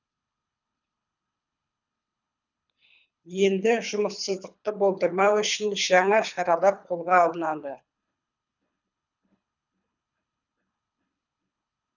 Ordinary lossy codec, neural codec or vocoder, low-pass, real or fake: none; codec, 24 kHz, 3 kbps, HILCodec; 7.2 kHz; fake